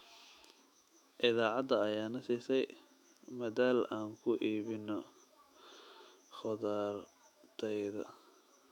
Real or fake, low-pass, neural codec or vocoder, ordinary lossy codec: fake; 19.8 kHz; autoencoder, 48 kHz, 128 numbers a frame, DAC-VAE, trained on Japanese speech; none